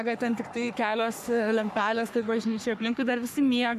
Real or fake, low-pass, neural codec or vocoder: fake; 14.4 kHz; codec, 44.1 kHz, 3.4 kbps, Pupu-Codec